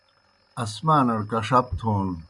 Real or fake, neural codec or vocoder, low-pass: real; none; 10.8 kHz